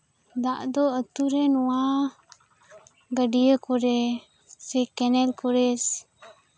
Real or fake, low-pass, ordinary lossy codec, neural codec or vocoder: real; none; none; none